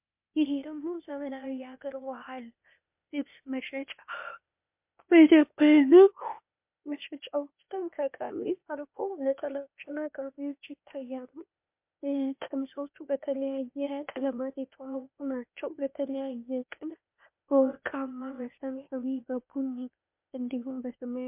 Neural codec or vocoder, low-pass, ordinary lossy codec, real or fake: codec, 16 kHz, 0.8 kbps, ZipCodec; 3.6 kHz; MP3, 32 kbps; fake